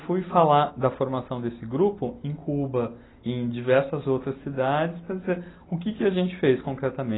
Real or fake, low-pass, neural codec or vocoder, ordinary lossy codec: real; 7.2 kHz; none; AAC, 16 kbps